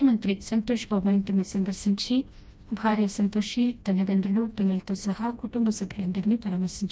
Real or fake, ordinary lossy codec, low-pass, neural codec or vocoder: fake; none; none; codec, 16 kHz, 1 kbps, FreqCodec, smaller model